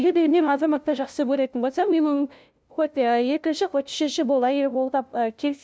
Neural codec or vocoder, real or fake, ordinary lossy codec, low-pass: codec, 16 kHz, 0.5 kbps, FunCodec, trained on LibriTTS, 25 frames a second; fake; none; none